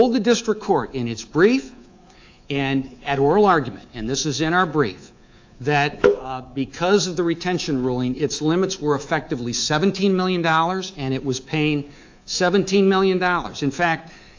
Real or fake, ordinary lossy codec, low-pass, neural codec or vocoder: fake; AAC, 48 kbps; 7.2 kHz; codec, 24 kHz, 3.1 kbps, DualCodec